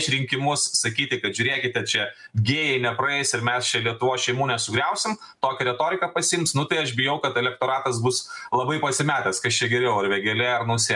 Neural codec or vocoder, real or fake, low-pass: none; real; 10.8 kHz